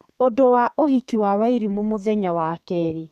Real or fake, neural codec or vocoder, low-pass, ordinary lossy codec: fake; codec, 32 kHz, 1.9 kbps, SNAC; 14.4 kHz; none